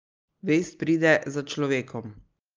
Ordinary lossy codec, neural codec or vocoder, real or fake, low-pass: Opus, 24 kbps; none; real; 7.2 kHz